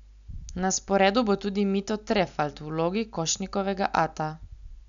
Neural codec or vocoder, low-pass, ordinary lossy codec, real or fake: none; 7.2 kHz; none; real